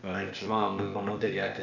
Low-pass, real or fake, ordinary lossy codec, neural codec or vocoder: 7.2 kHz; fake; none; codec, 16 kHz, 0.8 kbps, ZipCodec